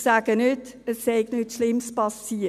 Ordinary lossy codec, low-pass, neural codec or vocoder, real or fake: none; 14.4 kHz; none; real